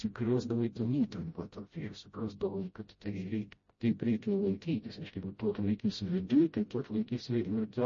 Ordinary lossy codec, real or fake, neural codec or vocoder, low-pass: MP3, 32 kbps; fake; codec, 16 kHz, 0.5 kbps, FreqCodec, smaller model; 7.2 kHz